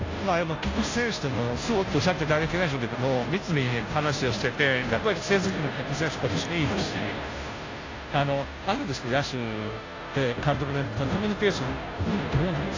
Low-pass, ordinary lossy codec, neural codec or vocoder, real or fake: 7.2 kHz; AAC, 32 kbps; codec, 16 kHz, 0.5 kbps, FunCodec, trained on Chinese and English, 25 frames a second; fake